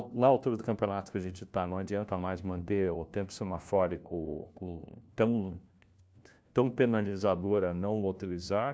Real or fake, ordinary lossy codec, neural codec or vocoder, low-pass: fake; none; codec, 16 kHz, 1 kbps, FunCodec, trained on LibriTTS, 50 frames a second; none